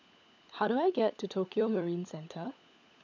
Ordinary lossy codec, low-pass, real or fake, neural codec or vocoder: none; 7.2 kHz; fake; codec, 16 kHz, 16 kbps, FunCodec, trained on LibriTTS, 50 frames a second